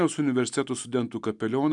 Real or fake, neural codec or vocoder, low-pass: real; none; 10.8 kHz